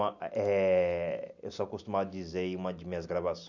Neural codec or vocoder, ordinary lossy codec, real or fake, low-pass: none; none; real; 7.2 kHz